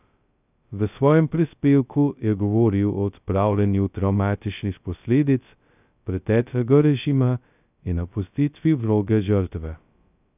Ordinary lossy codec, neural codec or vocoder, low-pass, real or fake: none; codec, 16 kHz, 0.2 kbps, FocalCodec; 3.6 kHz; fake